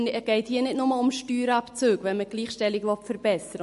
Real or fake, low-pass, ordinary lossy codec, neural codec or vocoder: real; 14.4 kHz; MP3, 48 kbps; none